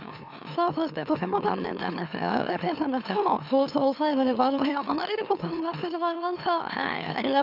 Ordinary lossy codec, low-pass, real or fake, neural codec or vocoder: none; 5.4 kHz; fake; autoencoder, 44.1 kHz, a latent of 192 numbers a frame, MeloTTS